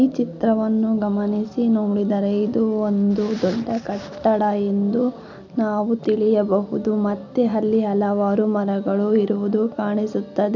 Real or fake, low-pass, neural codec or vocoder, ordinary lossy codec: real; 7.2 kHz; none; none